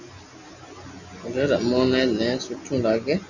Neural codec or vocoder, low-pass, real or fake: none; 7.2 kHz; real